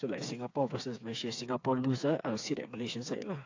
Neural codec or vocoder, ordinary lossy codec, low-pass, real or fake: codec, 16 kHz, 4 kbps, FreqCodec, smaller model; AAC, 48 kbps; 7.2 kHz; fake